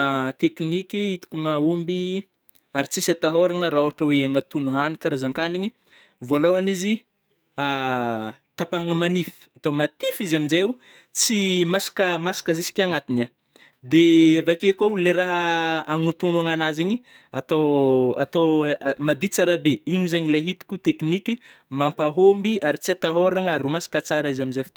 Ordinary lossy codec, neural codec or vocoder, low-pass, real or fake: none; codec, 44.1 kHz, 2.6 kbps, SNAC; none; fake